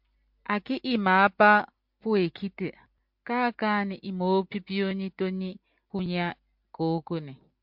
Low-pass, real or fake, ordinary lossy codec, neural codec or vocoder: 5.4 kHz; real; MP3, 48 kbps; none